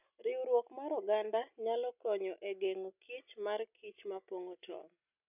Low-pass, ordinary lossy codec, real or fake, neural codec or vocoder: 3.6 kHz; none; real; none